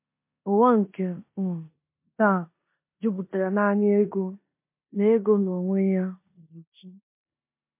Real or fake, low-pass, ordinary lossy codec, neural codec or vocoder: fake; 3.6 kHz; MP3, 24 kbps; codec, 16 kHz in and 24 kHz out, 0.9 kbps, LongCat-Audio-Codec, four codebook decoder